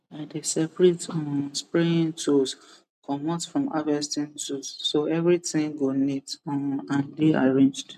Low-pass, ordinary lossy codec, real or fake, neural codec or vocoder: 14.4 kHz; none; real; none